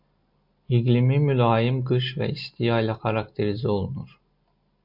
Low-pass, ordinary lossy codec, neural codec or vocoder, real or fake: 5.4 kHz; MP3, 48 kbps; none; real